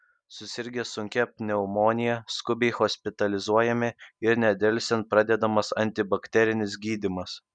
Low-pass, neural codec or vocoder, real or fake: 9.9 kHz; none; real